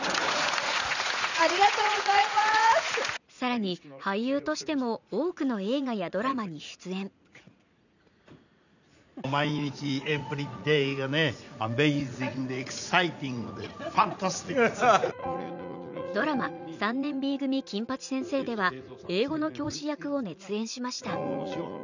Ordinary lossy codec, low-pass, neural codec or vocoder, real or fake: none; 7.2 kHz; vocoder, 44.1 kHz, 80 mel bands, Vocos; fake